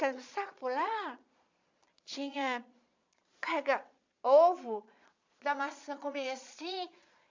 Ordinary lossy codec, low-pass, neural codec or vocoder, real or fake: MP3, 64 kbps; 7.2 kHz; vocoder, 22.05 kHz, 80 mel bands, Vocos; fake